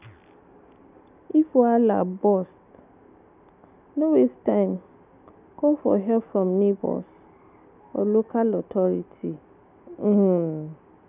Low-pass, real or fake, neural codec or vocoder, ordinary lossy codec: 3.6 kHz; real; none; none